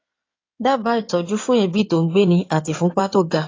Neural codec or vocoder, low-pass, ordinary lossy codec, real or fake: codec, 16 kHz in and 24 kHz out, 2.2 kbps, FireRedTTS-2 codec; 7.2 kHz; AAC, 32 kbps; fake